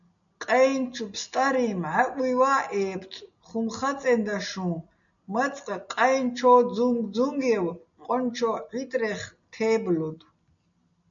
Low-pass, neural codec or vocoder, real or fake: 7.2 kHz; none; real